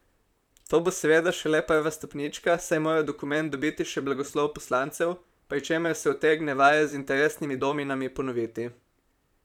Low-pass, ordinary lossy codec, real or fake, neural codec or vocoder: 19.8 kHz; none; fake; vocoder, 44.1 kHz, 128 mel bands, Pupu-Vocoder